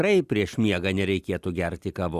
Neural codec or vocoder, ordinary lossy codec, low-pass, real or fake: none; Opus, 64 kbps; 14.4 kHz; real